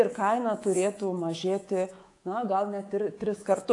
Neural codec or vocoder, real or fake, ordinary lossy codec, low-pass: codec, 24 kHz, 3.1 kbps, DualCodec; fake; AAC, 48 kbps; 10.8 kHz